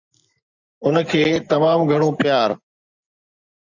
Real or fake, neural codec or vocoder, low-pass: real; none; 7.2 kHz